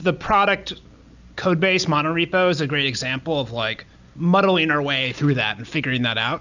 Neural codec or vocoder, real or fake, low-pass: none; real; 7.2 kHz